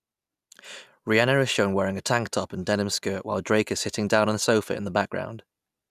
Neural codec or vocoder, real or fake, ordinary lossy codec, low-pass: none; real; none; 14.4 kHz